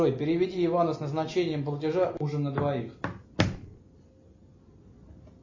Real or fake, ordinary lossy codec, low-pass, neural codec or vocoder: real; MP3, 32 kbps; 7.2 kHz; none